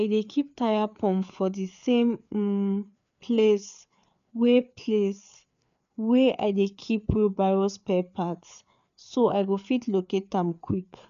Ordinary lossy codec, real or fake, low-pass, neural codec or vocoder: none; fake; 7.2 kHz; codec, 16 kHz, 4 kbps, FreqCodec, larger model